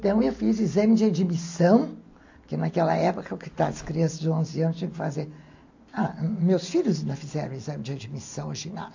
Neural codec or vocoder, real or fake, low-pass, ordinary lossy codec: none; real; 7.2 kHz; AAC, 48 kbps